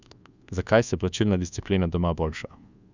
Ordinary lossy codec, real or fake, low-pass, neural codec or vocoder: Opus, 64 kbps; fake; 7.2 kHz; codec, 24 kHz, 1.2 kbps, DualCodec